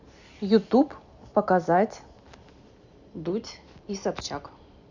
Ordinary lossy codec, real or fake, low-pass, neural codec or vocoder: none; real; 7.2 kHz; none